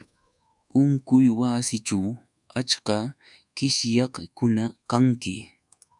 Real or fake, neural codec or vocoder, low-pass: fake; codec, 24 kHz, 1.2 kbps, DualCodec; 10.8 kHz